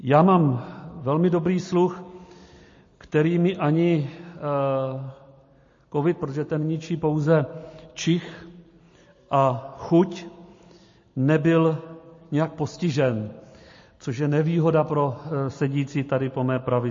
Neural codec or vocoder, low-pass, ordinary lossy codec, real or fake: none; 7.2 kHz; MP3, 32 kbps; real